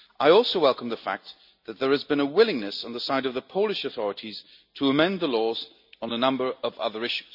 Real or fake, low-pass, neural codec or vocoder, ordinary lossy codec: real; 5.4 kHz; none; none